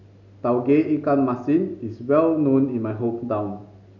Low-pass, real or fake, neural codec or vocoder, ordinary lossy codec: 7.2 kHz; real; none; none